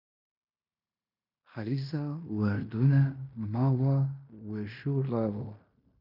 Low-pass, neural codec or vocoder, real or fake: 5.4 kHz; codec, 16 kHz in and 24 kHz out, 0.9 kbps, LongCat-Audio-Codec, fine tuned four codebook decoder; fake